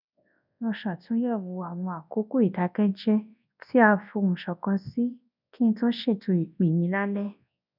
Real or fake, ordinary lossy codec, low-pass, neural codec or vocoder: fake; none; 5.4 kHz; codec, 24 kHz, 0.9 kbps, WavTokenizer, large speech release